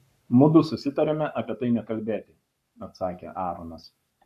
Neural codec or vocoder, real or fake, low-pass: codec, 44.1 kHz, 7.8 kbps, Pupu-Codec; fake; 14.4 kHz